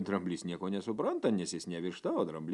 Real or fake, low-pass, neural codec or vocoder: real; 10.8 kHz; none